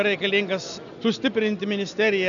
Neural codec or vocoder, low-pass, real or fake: none; 7.2 kHz; real